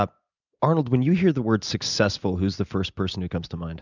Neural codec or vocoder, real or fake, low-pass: none; real; 7.2 kHz